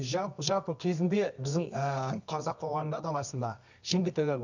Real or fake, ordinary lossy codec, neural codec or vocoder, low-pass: fake; none; codec, 24 kHz, 0.9 kbps, WavTokenizer, medium music audio release; 7.2 kHz